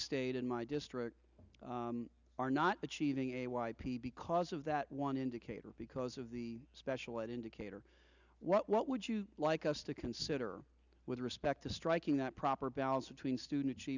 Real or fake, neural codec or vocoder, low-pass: real; none; 7.2 kHz